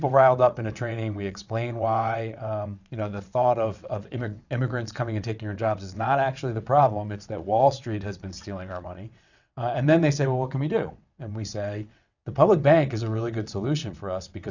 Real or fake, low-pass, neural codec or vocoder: fake; 7.2 kHz; vocoder, 22.05 kHz, 80 mel bands, WaveNeXt